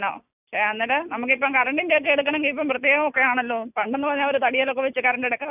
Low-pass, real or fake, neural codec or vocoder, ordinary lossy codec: 3.6 kHz; real; none; none